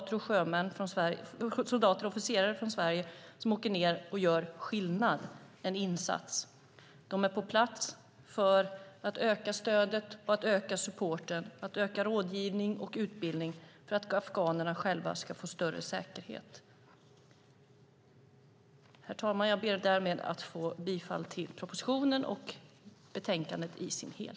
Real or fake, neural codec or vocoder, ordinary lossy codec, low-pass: real; none; none; none